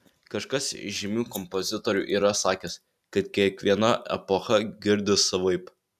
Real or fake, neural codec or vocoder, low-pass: real; none; 14.4 kHz